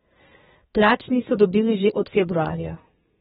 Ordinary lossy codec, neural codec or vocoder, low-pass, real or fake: AAC, 16 kbps; codec, 32 kHz, 1.9 kbps, SNAC; 14.4 kHz; fake